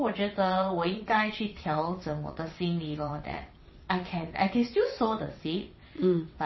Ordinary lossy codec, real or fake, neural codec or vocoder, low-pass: MP3, 24 kbps; fake; vocoder, 22.05 kHz, 80 mel bands, WaveNeXt; 7.2 kHz